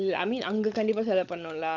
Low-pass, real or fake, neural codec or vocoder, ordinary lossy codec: 7.2 kHz; fake; codec, 16 kHz, 16 kbps, FunCodec, trained on LibriTTS, 50 frames a second; none